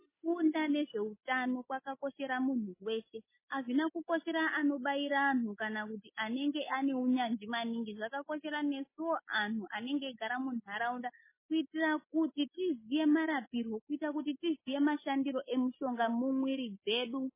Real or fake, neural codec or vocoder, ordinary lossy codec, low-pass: real; none; MP3, 16 kbps; 3.6 kHz